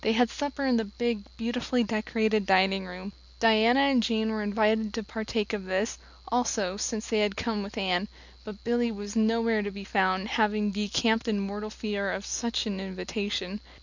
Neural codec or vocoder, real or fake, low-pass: none; real; 7.2 kHz